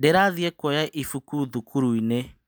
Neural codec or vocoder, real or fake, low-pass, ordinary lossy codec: none; real; none; none